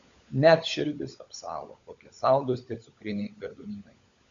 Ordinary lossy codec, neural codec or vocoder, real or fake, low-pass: MP3, 64 kbps; codec, 16 kHz, 16 kbps, FunCodec, trained on LibriTTS, 50 frames a second; fake; 7.2 kHz